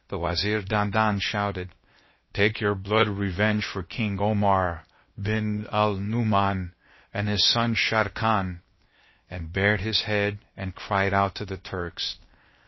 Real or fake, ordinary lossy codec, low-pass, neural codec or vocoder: fake; MP3, 24 kbps; 7.2 kHz; codec, 16 kHz, 0.7 kbps, FocalCodec